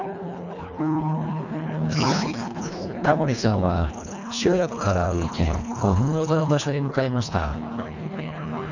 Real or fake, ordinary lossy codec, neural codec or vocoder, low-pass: fake; none; codec, 24 kHz, 1.5 kbps, HILCodec; 7.2 kHz